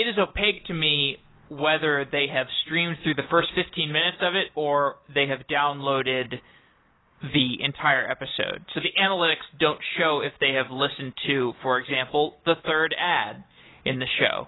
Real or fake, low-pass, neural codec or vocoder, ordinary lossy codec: real; 7.2 kHz; none; AAC, 16 kbps